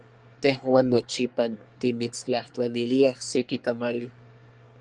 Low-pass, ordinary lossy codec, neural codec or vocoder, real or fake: 10.8 kHz; Opus, 32 kbps; codec, 24 kHz, 1 kbps, SNAC; fake